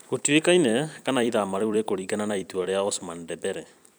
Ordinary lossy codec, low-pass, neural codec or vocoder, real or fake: none; none; vocoder, 44.1 kHz, 128 mel bands every 256 samples, BigVGAN v2; fake